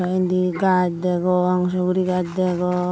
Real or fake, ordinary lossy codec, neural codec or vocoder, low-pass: real; none; none; none